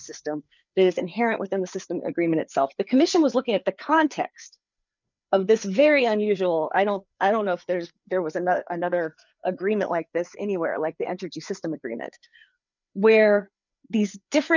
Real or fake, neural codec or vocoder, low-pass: fake; codec, 16 kHz, 4 kbps, FreqCodec, larger model; 7.2 kHz